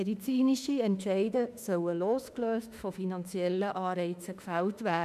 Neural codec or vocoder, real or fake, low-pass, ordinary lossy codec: autoencoder, 48 kHz, 32 numbers a frame, DAC-VAE, trained on Japanese speech; fake; 14.4 kHz; none